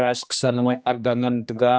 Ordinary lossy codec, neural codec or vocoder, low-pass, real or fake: none; codec, 16 kHz, 1 kbps, X-Codec, HuBERT features, trained on general audio; none; fake